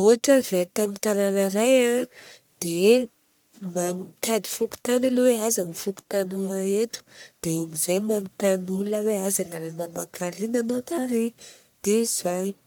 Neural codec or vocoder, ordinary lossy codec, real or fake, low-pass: codec, 44.1 kHz, 1.7 kbps, Pupu-Codec; none; fake; none